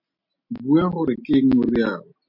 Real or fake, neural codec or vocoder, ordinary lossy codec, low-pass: real; none; MP3, 48 kbps; 5.4 kHz